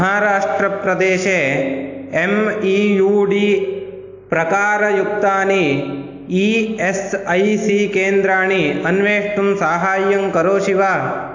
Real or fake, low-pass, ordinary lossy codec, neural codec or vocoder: real; 7.2 kHz; AAC, 48 kbps; none